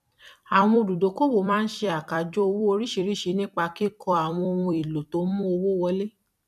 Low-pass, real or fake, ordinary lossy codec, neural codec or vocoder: 14.4 kHz; fake; none; vocoder, 44.1 kHz, 128 mel bands every 256 samples, BigVGAN v2